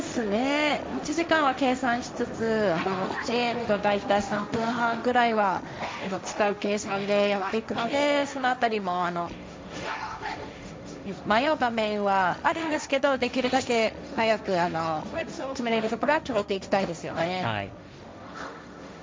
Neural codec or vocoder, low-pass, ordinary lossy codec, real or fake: codec, 16 kHz, 1.1 kbps, Voila-Tokenizer; none; none; fake